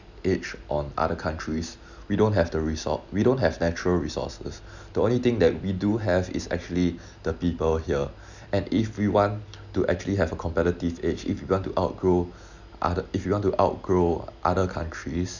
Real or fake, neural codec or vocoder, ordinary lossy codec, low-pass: real; none; none; 7.2 kHz